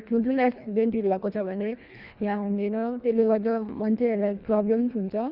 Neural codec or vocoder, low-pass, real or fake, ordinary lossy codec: codec, 24 kHz, 1.5 kbps, HILCodec; 5.4 kHz; fake; Opus, 64 kbps